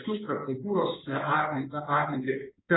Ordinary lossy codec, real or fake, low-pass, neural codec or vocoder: AAC, 16 kbps; fake; 7.2 kHz; codec, 16 kHz in and 24 kHz out, 2.2 kbps, FireRedTTS-2 codec